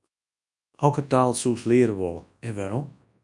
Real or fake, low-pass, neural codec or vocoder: fake; 10.8 kHz; codec, 24 kHz, 0.9 kbps, WavTokenizer, large speech release